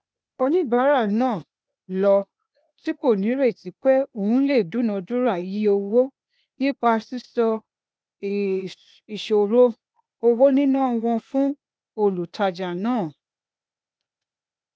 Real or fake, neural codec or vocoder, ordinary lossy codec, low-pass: fake; codec, 16 kHz, 0.8 kbps, ZipCodec; none; none